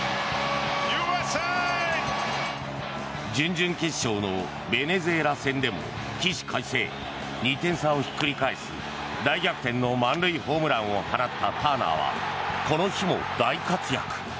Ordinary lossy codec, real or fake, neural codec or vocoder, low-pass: none; real; none; none